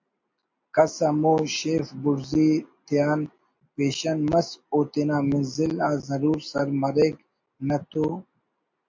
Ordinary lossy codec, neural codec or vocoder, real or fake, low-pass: MP3, 48 kbps; none; real; 7.2 kHz